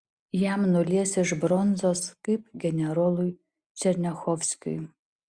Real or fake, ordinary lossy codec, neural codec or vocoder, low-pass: real; Opus, 64 kbps; none; 9.9 kHz